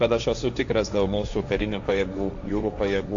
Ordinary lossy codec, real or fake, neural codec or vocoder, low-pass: AAC, 64 kbps; fake; codec, 16 kHz, 1.1 kbps, Voila-Tokenizer; 7.2 kHz